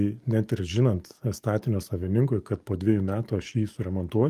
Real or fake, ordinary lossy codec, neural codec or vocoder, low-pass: fake; Opus, 32 kbps; codec, 44.1 kHz, 7.8 kbps, DAC; 14.4 kHz